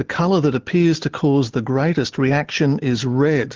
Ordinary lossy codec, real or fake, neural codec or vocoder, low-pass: Opus, 16 kbps; real; none; 7.2 kHz